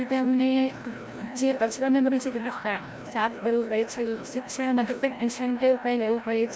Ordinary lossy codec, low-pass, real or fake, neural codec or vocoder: none; none; fake; codec, 16 kHz, 0.5 kbps, FreqCodec, larger model